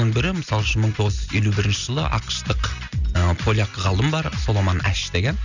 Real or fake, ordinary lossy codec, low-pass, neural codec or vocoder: real; none; 7.2 kHz; none